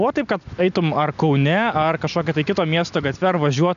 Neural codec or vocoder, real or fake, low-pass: none; real; 7.2 kHz